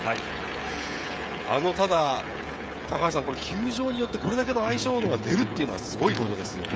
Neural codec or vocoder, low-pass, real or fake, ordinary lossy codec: codec, 16 kHz, 16 kbps, FreqCodec, smaller model; none; fake; none